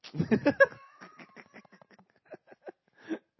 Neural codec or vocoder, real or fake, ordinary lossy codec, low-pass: none; real; MP3, 24 kbps; 7.2 kHz